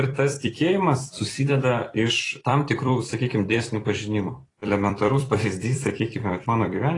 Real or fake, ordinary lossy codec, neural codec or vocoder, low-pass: fake; AAC, 32 kbps; vocoder, 44.1 kHz, 128 mel bands every 256 samples, BigVGAN v2; 10.8 kHz